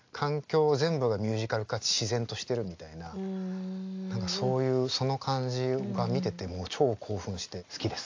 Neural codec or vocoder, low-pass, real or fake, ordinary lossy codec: none; 7.2 kHz; real; AAC, 48 kbps